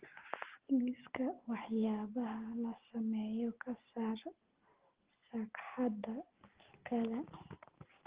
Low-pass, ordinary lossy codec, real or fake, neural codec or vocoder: 3.6 kHz; Opus, 16 kbps; real; none